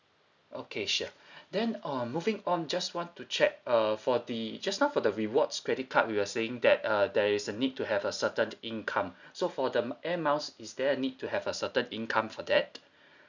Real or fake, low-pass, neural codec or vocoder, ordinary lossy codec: real; 7.2 kHz; none; none